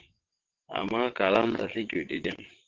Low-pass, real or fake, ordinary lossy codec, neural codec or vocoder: 7.2 kHz; fake; Opus, 16 kbps; vocoder, 22.05 kHz, 80 mel bands, WaveNeXt